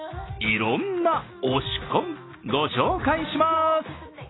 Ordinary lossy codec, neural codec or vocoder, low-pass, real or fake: AAC, 16 kbps; none; 7.2 kHz; real